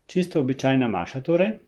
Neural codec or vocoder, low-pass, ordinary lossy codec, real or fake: vocoder, 44.1 kHz, 128 mel bands, Pupu-Vocoder; 19.8 kHz; Opus, 24 kbps; fake